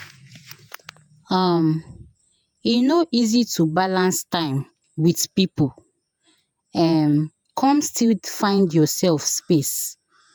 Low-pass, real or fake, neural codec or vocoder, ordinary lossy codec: none; fake; vocoder, 48 kHz, 128 mel bands, Vocos; none